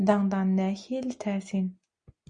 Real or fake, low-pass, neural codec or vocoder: real; 9.9 kHz; none